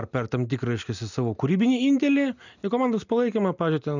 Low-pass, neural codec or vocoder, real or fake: 7.2 kHz; none; real